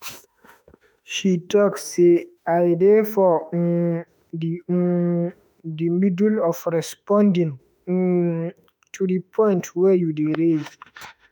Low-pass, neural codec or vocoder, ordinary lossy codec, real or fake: none; autoencoder, 48 kHz, 32 numbers a frame, DAC-VAE, trained on Japanese speech; none; fake